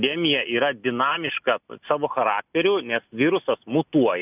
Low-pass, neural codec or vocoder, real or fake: 3.6 kHz; none; real